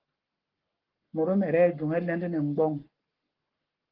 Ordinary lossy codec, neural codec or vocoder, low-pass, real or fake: Opus, 16 kbps; codec, 44.1 kHz, 7.8 kbps, Pupu-Codec; 5.4 kHz; fake